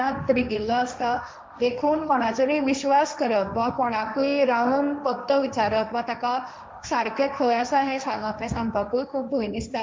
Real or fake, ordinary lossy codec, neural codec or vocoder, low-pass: fake; none; codec, 16 kHz, 1.1 kbps, Voila-Tokenizer; none